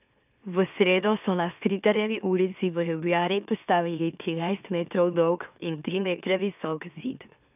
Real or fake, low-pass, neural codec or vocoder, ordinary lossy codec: fake; 3.6 kHz; autoencoder, 44.1 kHz, a latent of 192 numbers a frame, MeloTTS; none